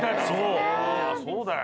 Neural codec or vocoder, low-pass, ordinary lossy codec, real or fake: none; none; none; real